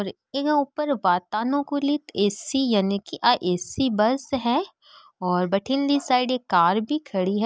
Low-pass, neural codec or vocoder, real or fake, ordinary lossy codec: none; none; real; none